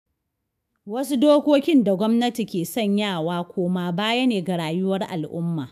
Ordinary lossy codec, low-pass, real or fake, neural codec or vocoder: none; 14.4 kHz; fake; autoencoder, 48 kHz, 128 numbers a frame, DAC-VAE, trained on Japanese speech